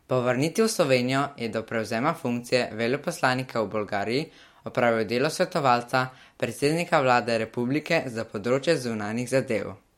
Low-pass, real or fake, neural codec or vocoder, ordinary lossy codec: 19.8 kHz; real; none; MP3, 64 kbps